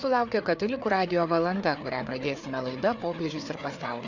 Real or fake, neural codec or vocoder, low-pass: fake; codec, 16 kHz, 4 kbps, FreqCodec, larger model; 7.2 kHz